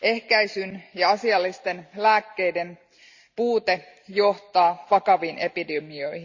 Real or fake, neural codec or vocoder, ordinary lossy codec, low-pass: real; none; Opus, 64 kbps; 7.2 kHz